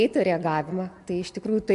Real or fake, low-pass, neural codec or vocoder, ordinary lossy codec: real; 10.8 kHz; none; MP3, 64 kbps